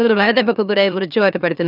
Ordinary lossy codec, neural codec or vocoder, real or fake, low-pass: none; autoencoder, 44.1 kHz, a latent of 192 numbers a frame, MeloTTS; fake; 5.4 kHz